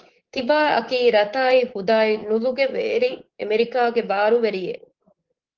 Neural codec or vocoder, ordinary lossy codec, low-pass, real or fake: codec, 24 kHz, 3.1 kbps, DualCodec; Opus, 16 kbps; 7.2 kHz; fake